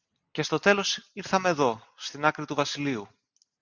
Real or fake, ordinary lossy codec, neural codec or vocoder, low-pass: real; Opus, 64 kbps; none; 7.2 kHz